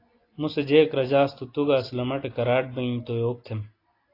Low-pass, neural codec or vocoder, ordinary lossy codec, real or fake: 5.4 kHz; none; AAC, 32 kbps; real